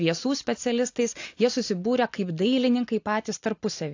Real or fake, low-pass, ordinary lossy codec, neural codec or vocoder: real; 7.2 kHz; AAC, 48 kbps; none